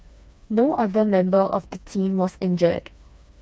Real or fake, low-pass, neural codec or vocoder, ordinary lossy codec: fake; none; codec, 16 kHz, 2 kbps, FreqCodec, smaller model; none